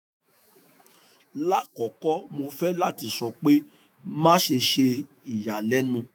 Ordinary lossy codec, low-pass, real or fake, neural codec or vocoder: none; none; fake; autoencoder, 48 kHz, 128 numbers a frame, DAC-VAE, trained on Japanese speech